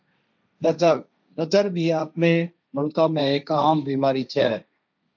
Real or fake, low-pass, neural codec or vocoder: fake; 7.2 kHz; codec, 16 kHz, 1.1 kbps, Voila-Tokenizer